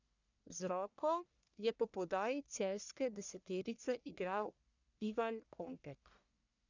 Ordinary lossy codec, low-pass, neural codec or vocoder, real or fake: none; 7.2 kHz; codec, 44.1 kHz, 1.7 kbps, Pupu-Codec; fake